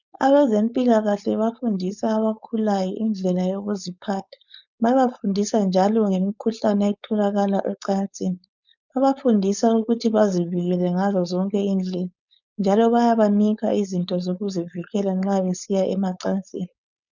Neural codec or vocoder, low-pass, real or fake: codec, 16 kHz, 4.8 kbps, FACodec; 7.2 kHz; fake